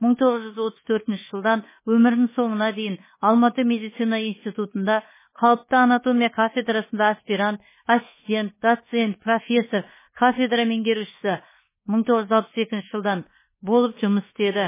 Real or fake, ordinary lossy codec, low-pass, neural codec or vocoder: fake; MP3, 16 kbps; 3.6 kHz; codec, 24 kHz, 1.2 kbps, DualCodec